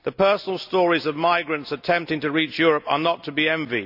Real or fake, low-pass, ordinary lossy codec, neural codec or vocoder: real; 5.4 kHz; none; none